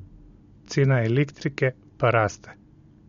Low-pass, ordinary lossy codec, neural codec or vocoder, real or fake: 7.2 kHz; MP3, 48 kbps; none; real